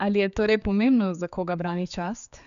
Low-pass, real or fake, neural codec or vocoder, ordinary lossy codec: 7.2 kHz; fake; codec, 16 kHz, 4 kbps, X-Codec, HuBERT features, trained on general audio; none